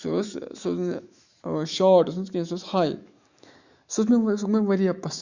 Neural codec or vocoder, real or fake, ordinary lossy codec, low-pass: autoencoder, 48 kHz, 128 numbers a frame, DAC-VAE, trained on Japanese speech; fake; Opus, 64 kbps; 7.2 kHz